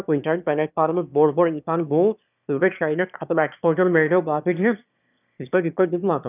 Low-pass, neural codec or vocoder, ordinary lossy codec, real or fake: 3.6 kHz; autoencoder, 22.05 kHz, a latent of 192 numbers a frame, VITS, trained on one speaker; none; fake